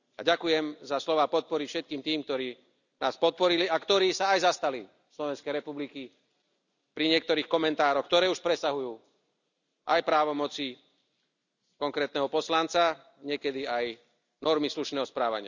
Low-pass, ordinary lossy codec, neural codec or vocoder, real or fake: 7.2 kHz; none; none; real